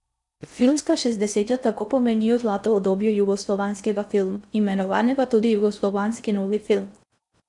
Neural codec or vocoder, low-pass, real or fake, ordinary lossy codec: codec, 16 kHz in and 24 kHz out, 0.6 kbps, FocalCodec, streaming, 4096 codes; 10.8 kHz; fake; none